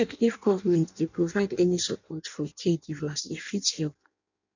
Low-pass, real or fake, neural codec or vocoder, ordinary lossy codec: 7.2 kHz; fake; codec, 16 kHz in and 24 kHz out, 0.6 kbps, FireRedTTS-2 codec; none